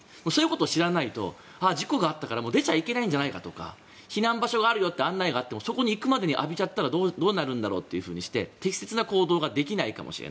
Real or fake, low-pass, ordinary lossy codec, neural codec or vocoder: real; none; none; none